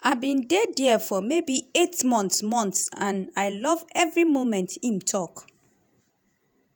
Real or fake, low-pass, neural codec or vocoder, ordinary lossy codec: fake; none; vocoder, 48 kHz, 128 mel bands, Vocos; none